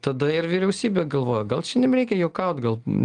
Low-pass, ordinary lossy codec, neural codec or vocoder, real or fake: 9.9 kHz; Opus, 24 kbps; none; real